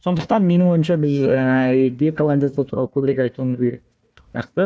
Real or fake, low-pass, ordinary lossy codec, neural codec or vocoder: fake; none; none; codec, 16 kHz, 1 kbps, FunCodec, trained on Chinese and English, 50 frames a second